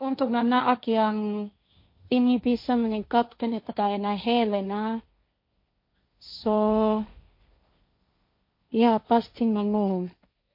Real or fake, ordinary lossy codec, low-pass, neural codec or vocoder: fake; MP3, 32 kbps; 5.4 kHz; codec, 16 kHz, 1.1 kbps, Voila-Tokenizer